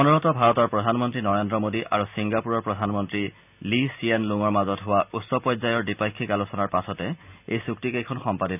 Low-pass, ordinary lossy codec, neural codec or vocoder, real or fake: 3.6 kHz; none; none; real